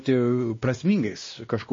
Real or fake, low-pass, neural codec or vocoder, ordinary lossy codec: fake; 7.2 kHz; codec, 16 kHz, 1 kbps, X-Codec, WavLM features, trained on Multilingual LibriSpeech; MP3, 32 kbps